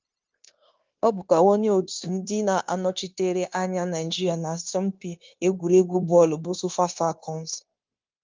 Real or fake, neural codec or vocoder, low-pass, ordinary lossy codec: fake; codec, 16 kHz, 0.9 kbps, LongCat-Audio-Codec; 7.2 kHz; Opus, 24 kbps